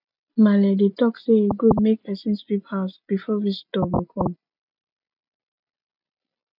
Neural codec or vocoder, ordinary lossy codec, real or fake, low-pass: none; none; real; 5.4 kHz